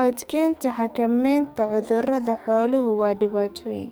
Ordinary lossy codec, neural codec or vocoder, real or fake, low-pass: none; codec, 44.1 kHz, 2.6 kbps, SNAC; fake; none